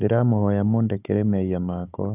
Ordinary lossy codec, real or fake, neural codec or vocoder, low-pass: none; fake; codec, 16 kHz, 16 kbps, FunCodec, trained on Chinese and English, 50 frames a second; 3.6 kHz